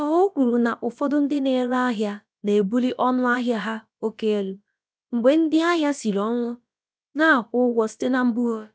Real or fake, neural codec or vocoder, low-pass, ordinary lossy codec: fake; codec, 16 kHz, about 1 kbps, DyCAST, with the encoder's durations; none; none